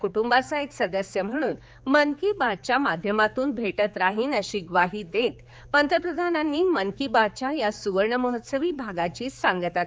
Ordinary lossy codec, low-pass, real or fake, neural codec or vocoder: none; none; fake; codec, 16 kHz, 4 kbps, X-Codec, HuBERT features, trained on general audio